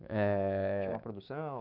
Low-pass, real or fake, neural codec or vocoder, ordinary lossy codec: 5.4 kHz; fake; codec, 16 kHz, 6 kbps, DAC; none